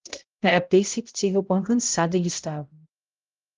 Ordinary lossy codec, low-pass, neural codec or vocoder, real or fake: Opus, 32 kbps; 7.2 kHz; codec, 16 kHz, 0.5 kbps, X-Codec, HuBERT features, trained on balanced general audio; fake